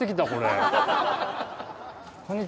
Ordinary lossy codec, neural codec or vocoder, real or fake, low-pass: none; none; real; none